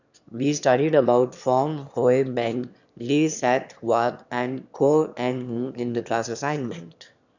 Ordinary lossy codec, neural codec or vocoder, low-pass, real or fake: none; autoencoder, 22.05 kHz, a latent of 192 numbers a frame, VITS, trained on one speaker; 7.2 kHz; fake